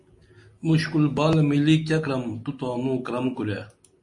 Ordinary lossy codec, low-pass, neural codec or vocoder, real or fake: MP3, 48 kbps; 10.8 kHz; none; real